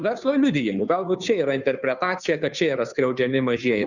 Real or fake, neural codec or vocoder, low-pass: fake; codec, 16 kHz, 2 kbps, FunCodec, trained on Chinese and English, 25 frames a second; 7.2 kHz